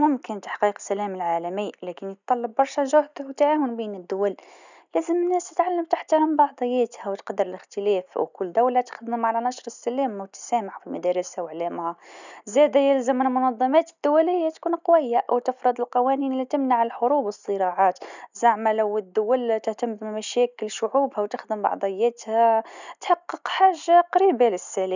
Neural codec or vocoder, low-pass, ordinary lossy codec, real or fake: none; 7.2 kHz; none; real